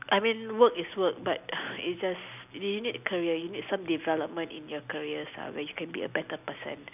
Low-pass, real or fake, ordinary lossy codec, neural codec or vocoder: 3.6 kHz; real; none; none